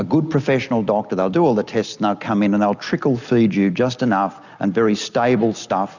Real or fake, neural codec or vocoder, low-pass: real; none; 7.2 kHz